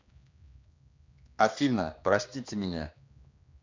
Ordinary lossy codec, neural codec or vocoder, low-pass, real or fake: MP3, 64 kbps; codec, 16 kHz, 2 kbps, X-Codec, HuBERT features, trained on general audio; 7.2 kHz; fake